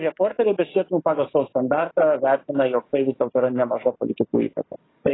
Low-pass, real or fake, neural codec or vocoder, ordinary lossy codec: 7.2 kHz; fake; codec, 44.1 kHz, 7.8 kbps, DAC; AAC, 16 kbps